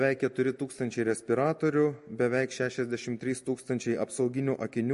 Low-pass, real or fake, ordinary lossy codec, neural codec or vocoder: 14.4 kHz; real; MP3, 48 kbps; none